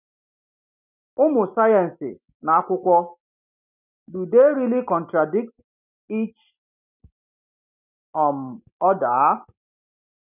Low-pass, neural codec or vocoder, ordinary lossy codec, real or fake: 3.6 kHz; none; none; real